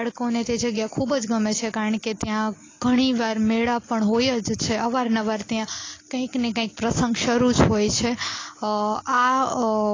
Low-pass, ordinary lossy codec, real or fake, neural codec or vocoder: 7.2 kHz; AAC, 32 kbps; real; none